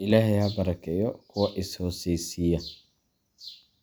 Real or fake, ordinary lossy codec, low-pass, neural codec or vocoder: real; none; none; none